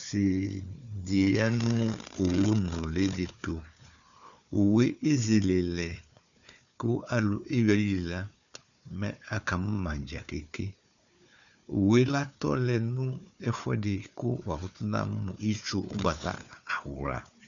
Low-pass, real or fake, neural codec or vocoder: 7.2 kHz; fake; codec, 16 kHz, 4 kbps, FunCodec, trained on Chinese and English, 50 frames a second